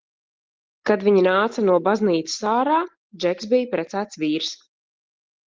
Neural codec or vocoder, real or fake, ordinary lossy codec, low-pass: none; real; Opus, 16 kbps; 7.2 kHz